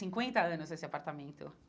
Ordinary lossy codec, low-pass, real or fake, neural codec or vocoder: none; none; real; none